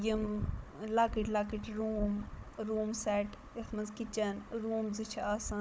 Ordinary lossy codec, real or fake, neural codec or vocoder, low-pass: none; fake; codec, 16 kHz, 16 kbps, FunCodec, trained on Chinese and English, 50 frames a second; none